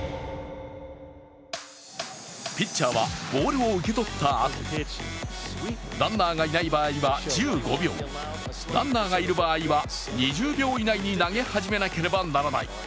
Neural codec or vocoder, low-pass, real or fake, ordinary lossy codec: none; none; real; none